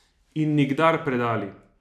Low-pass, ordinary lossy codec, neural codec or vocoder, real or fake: 14.4 kHz; none; vocoder, 44.1 kHz, 128 mel bands every 256 samples, BigVGAN v2; fake